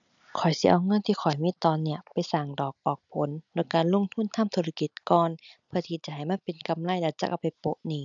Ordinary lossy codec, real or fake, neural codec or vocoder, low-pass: none; real; none; 7.2 kHz